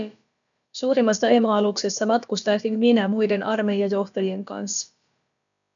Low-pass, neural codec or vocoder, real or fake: 7.2 kHz; codec, 16 kHz, about 1 kbps, DyCAST, with the encoder's durations; fake